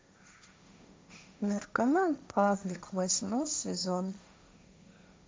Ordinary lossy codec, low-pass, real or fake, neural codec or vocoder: none; none; fake; codec, 16 kHz, 1.1 kbps, Voila-Tokenizer